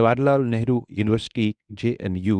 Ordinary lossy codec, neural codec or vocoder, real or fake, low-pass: none; codec, 24 kHz, 0.9 kbps, WavTokenizer, medium speech release version 1; fake; 9.9 kHz